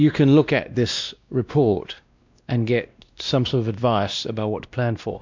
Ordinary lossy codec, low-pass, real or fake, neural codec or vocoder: MP3, 64 kbps; 7.2 kHz; fake; codec, 16 kHz, 1 kbps, X-Codec, WavLM features, trained on Multilingual LibriSpeech